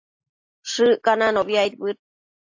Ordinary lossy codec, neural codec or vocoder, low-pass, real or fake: AAC, 48 kbps; none; 7.2 kHz; real